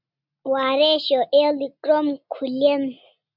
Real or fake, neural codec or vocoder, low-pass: real; none; 5.4 kHz